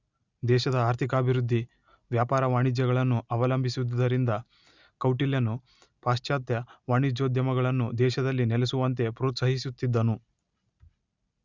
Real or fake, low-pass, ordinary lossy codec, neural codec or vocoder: real; 7.2 kHz; Opus, 64 kbps; none